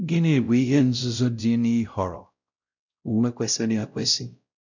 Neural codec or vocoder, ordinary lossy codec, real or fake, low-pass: codec, 16 kHz, 0.5 kbps, X-Codec, WavLM features, trained on Multilingual LibriSpeech; none; fake; 7.2 kHz